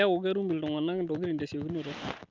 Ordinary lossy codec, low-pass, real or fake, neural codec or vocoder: Opus, 32 kbps; 7.2 kHz; real; none